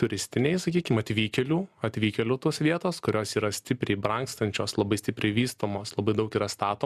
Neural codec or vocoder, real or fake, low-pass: none; real; 14.4 kHz